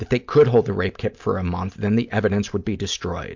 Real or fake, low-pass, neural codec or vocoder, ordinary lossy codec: real; 7.2 kHz; none; MP3, 64 kbps